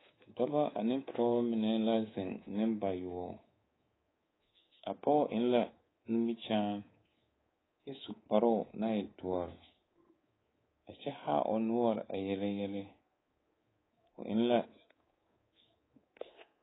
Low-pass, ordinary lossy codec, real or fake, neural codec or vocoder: 7.2 kHz; AAC, 16 kbps; fake; codec, 24 kHz, 3.1 kbps, DualCodec